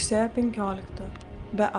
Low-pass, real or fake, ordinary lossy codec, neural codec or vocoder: 9.9 kHz; real; Opus, 24 kbps; none